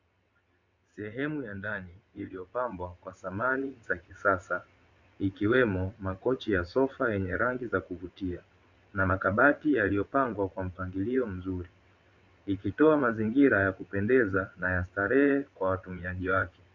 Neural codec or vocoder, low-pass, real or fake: vocoder, 22.05 kHz, 80 mel bands, WaveNeXt; 7.2 kHz; fake